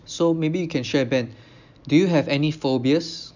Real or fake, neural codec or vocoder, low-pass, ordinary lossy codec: real; none; 7.2 kHz; none